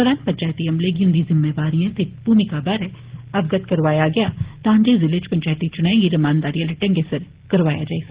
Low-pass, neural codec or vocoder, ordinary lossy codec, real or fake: 3.6 kHz; none; Opus, 16 kbps; real